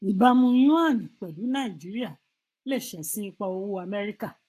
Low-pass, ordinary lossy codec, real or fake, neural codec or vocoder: 14.4 kHz; AAC, 64 kbps; fake; codec, 44.1 kHz, 7.8 kbps, Pupu-Codec